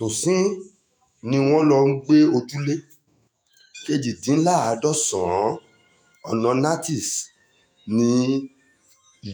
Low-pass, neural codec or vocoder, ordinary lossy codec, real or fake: none; autoencoder, 48 kHz, 128 numbers a frame, DAC-VAE, trained on Japanese speech; none; fake